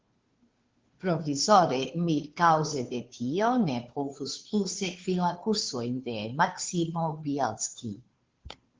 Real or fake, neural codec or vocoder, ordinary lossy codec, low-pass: fake; codec, 16 kHz, 2 kbps, FunCodec, trained on Chinese and English, 25 frames a second; Opus, 16 kbps; 7.2 kHz